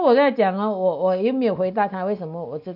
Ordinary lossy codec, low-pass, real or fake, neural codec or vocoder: none; 5.4 kHz; real; none